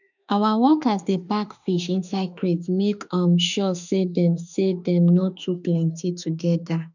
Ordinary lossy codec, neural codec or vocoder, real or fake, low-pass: none; autoencoder, 48 kHz, 32 numbers a frame, DAC-VAE, trained on Japanese speech; fake; 7.2 kHz